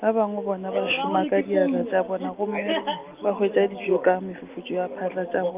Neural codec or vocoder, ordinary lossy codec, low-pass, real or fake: none; Opus, 24 kbps; 3.6 kHz; real